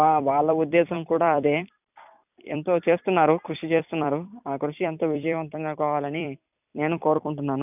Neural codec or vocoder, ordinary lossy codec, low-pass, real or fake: vocoder, 44.1 kHz, 128 mel bands every 256 samples, BigVGAN v2; none; 3.6 kHz; fake